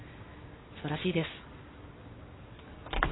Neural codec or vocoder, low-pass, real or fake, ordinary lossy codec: none; 7.2 kHz; real; AAC, 16 kbps